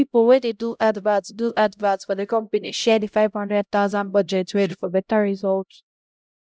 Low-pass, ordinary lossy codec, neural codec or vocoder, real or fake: none; none; codec, 16 kHz, 0.5 kbps, X-Codec, HuBERT features, trained on LibriSpeech; fake